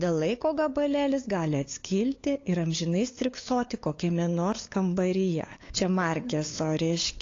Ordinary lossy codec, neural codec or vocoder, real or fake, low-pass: AAC, 32 kbps; codec, 16 kHz, 8 kbps, FunCodec, trained on LibriTTS, 25 frames a second; fake; 7.2 kHz